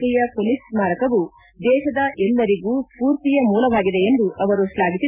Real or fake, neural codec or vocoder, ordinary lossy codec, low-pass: real; none; none; 3.6 kHz